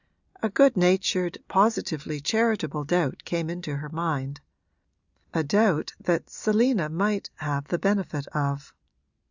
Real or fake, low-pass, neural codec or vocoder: real; 7.2 kHz; none